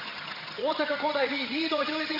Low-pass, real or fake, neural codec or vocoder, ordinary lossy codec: 5.4 kHz; fake; vocoder, 22.05 kHz, 80 mel bands, HiFi-GAN; MP3, 32 kbps